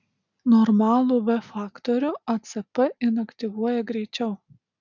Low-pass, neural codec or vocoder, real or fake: 7.2 kHz; none; real